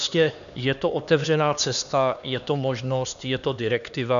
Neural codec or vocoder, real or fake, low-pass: codec, 16 kHz, 2 kbps, X-Codec, HuBERT features, trained on LibriSpeech; fake; 7.2 kHz